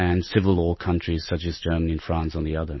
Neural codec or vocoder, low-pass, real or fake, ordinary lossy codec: none; 7.2 kHz; real; MP3, 24 kbps